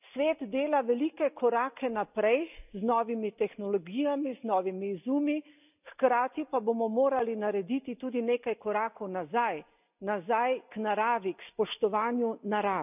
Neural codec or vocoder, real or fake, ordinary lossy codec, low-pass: none; real; none; 3.6 kHz